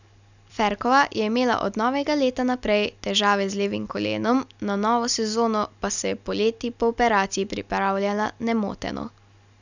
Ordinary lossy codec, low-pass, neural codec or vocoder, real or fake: none; 7.2 kHz; none; real